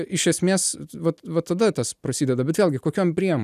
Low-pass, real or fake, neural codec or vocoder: 14.4 kHz; real; none